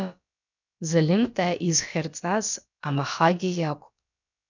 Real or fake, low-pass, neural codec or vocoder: fake; 7.2 kHz; codec, 16 kHz, about 1 kbps, DyCAST, with the encoder's durations